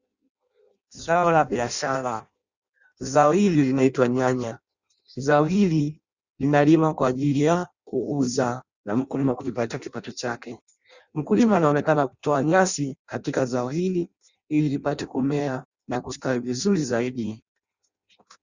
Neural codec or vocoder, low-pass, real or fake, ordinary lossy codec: codec, 16 kHz in and 24 kHz out, 0.6 kbps, FireRedTTS-2 codec; 7.2 kHz; fake; Opus, 64 kbps